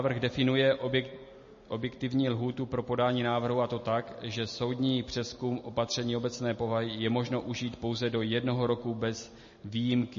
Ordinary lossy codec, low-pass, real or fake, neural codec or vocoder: MP3, 32 kbps; 7.2 kHz; real; none